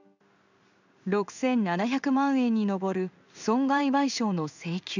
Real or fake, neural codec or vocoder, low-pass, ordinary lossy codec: fake; codec, 16 kHz in and 24 kHz out, 1 kbps, XY-Tokenizer; 7.2 kHz; none